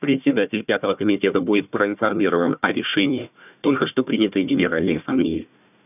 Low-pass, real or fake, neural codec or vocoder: 3.6 kHz; fake; codec, 16 kHz, 1 kbps, FunCodec, trained on Chinese and English, 50 frames a second